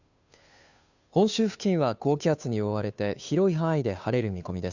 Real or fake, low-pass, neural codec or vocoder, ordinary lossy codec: fake; 7.2 kHz; codec, 16 kHz, 2 kbps, FunCodec, trained on Chinese and English, 25 frames a second; none